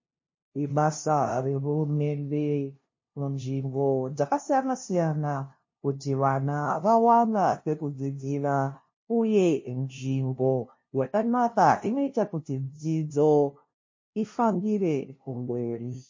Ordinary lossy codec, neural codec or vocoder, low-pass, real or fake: MP3, 32 kbps; codec, 16 kHz, 0.5 kbps, FunCodec, trained on LibriTTS, 25 frames a second; 7.2 kHz; fake